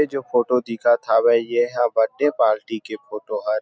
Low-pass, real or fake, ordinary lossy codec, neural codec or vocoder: none; real; none; none